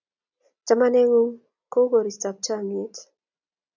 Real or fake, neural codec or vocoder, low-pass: real; none; 7.2 kHz